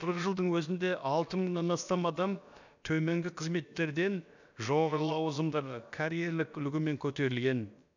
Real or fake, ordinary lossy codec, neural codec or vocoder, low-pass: fake; none; codec, 16 kHz, about 1 kbps, DyCAST, with the encoder's durations; 7.2 kHz